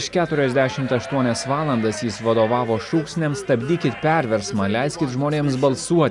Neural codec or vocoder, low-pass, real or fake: none; 10.8 kHz; real